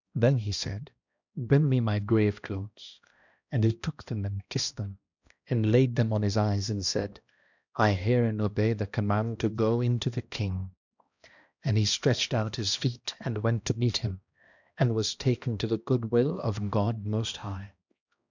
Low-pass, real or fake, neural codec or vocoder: 7.2 kHz; fake; codec, 16 kHz, 1 kbps, X-Codec, HuBERT features, trained on balanced general audio